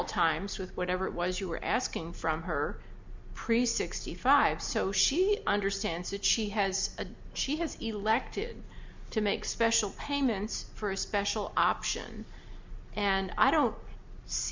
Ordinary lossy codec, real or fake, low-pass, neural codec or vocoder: MP3, 64 kbps; real; 7.2 kHz; none